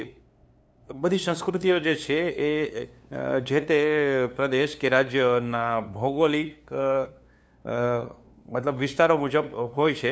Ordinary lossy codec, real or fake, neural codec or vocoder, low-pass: none; fake; codec, 16 kHz, 2 kbps, FunCodec, trained on LibriTTS, 25 frames a second; none